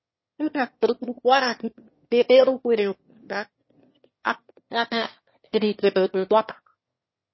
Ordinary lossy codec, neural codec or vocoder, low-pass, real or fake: MP3, 24 kbps; autoencoder, 22.05 kHz, a latent of 192 numbers a frame, VITS, trained on one speaker; 7.2 kHz; fake